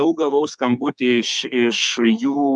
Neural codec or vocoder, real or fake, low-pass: codec, 32 kHz, 1.9 kbps, SNAC; fake; 10.8 kHz